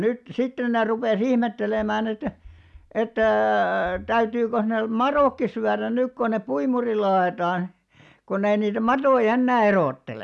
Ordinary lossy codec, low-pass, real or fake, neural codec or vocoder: none; none; real; none